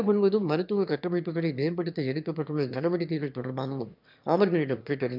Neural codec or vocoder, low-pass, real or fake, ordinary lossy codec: autoencoder, 22.05 kHz, a latent of 192 numbers a frame, VITS, trained on one speaker; 5.4 kHz; fake; none